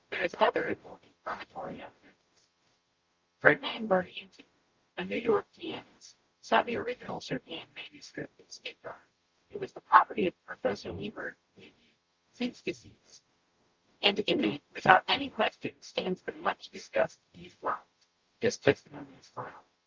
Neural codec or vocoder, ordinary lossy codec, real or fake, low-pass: codec, 44.1 kHz, 0.9 kbps, DAC; Opus, 32 kbps; fake; 7.2 kHz